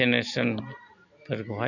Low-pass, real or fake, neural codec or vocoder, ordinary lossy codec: 7.2 kHz; real; none; none